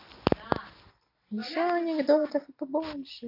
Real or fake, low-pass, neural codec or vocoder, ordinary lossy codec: real; 5.4 kHz; none; none